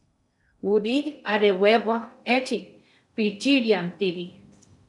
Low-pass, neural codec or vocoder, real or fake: 10.8 kHz; codec, 16 kHz in and 24 kHz out, 0.6 kbps, FocalCodec, streaming, 2048 codes; fake